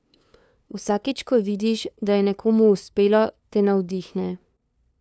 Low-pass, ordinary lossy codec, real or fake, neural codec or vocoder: none; none; fake; codec, 16 kHz, 2 kbps, FunCodec, trained on LibriTTS, 25 frames a second